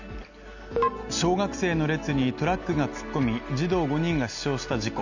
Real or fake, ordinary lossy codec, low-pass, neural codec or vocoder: real; none; 7.2 kHz; none